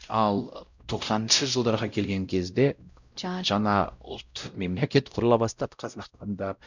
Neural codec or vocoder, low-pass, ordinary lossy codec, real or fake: codec, 16 kHz, 0.5 kbps, X-Codec, WavLM features, trained on Multilingual LibriSpeech; 7.2 kHz; none; fake